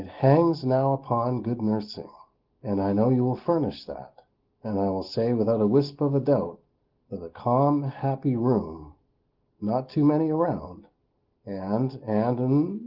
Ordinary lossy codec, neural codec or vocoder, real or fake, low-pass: Opus, 16 kbps; none; real; 5.4 kHz